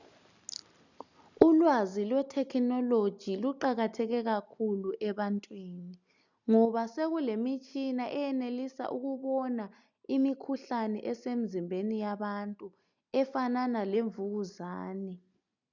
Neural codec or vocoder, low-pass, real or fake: none; 7.2 kHz; real